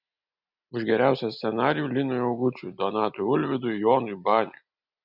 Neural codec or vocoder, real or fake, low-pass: none; real; 5.4 kHz